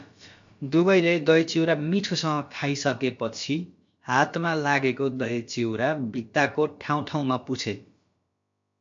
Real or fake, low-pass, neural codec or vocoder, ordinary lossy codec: fake; 7.2 kHz; codec, 16 kHz, about 1 kbps, DyCAST, with the encoder's durations; MP3, 48 kbps